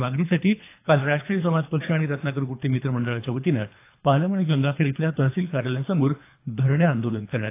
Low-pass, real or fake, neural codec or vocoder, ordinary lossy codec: 3.6 kHz; fake; codec, 24 kHz, 3 kbps, HILCodec; AAC, 24 kbps